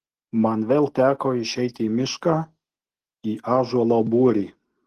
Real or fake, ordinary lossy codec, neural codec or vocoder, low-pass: real; Opus, 16 kbps; none; 14.4 kHz